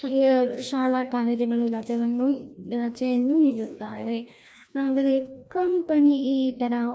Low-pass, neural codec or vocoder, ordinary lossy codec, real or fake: none; codec, 16 kHz, 1 kbps, FreqCodec, larger model; none; fake